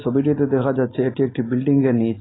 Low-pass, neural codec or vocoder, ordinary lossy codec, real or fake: 7.2 kHz; none; AAC, 16 kbps; real